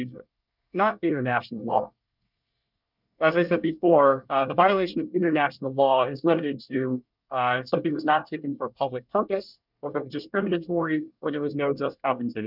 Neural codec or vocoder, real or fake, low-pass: codec, 24 kHz, 1 kbps, SNAC; fake; 5.4 kHz